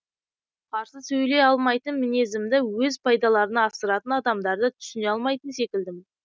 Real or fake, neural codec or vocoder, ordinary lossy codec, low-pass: real; none; none; none